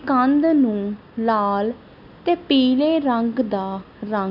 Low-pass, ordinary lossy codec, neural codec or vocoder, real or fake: 5.4 kHz; none; none; real